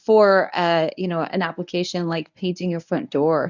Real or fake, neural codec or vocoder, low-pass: fake; codec, 24 kHz, 0.9 kbps, WavTokenizer, medium speech release version 1; 7.2 kHz